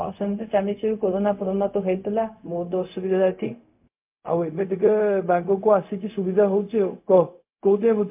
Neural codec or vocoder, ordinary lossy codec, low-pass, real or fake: codec, 16 kHz, 0.4 kbps, LongCat-Audio-Codec; MP3, 32 kbps; 3.6 kHz; fake